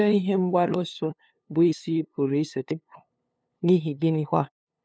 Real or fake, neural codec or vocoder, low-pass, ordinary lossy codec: fake; codec, 16 kHz, 2 kbps, FunCodec, trained on LibriTTS, 25 frames a second; none; none